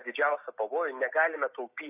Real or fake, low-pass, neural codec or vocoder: real; 3.6 kHz; none